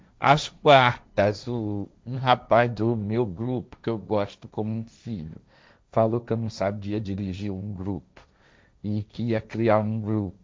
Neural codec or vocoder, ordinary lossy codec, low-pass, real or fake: codec, 16 kHz, 1.1 kbps, Voila-Tokenizer; none; none; fake